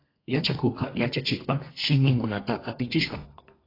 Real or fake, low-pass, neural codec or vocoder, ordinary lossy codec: fake; 5.4 kHz; codec, 32 kHz, 1.9 kbps, SNAC; AAC, 24 kbps